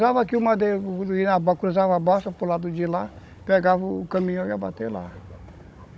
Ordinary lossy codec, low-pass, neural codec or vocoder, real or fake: none; none; codec, 16 kHz, 16 kbps, FunCodec, trained on Chinese and English, 50 frames a second; fake